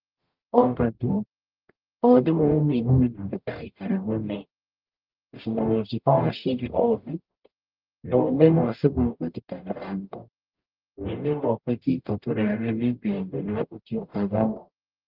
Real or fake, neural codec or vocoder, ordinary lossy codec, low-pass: fake; codec, 44.1 kHz, 0.9 kbps, DAC; Opus, 32 kbps; 5.4 kHz